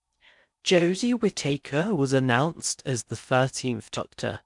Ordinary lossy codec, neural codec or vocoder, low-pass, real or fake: none; codec, 16 kHz in and 24 kHz out, 0.6 kbps, FocalCodec, streaming, 4096 codes; 10.8 kHz; fake